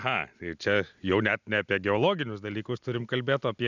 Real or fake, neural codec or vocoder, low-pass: real; none; 7.2 kHz